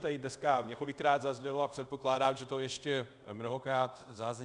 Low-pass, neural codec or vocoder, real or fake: 10.8 kHz; codec, 24 kHz, 0.5 kbps, DualCodec; fake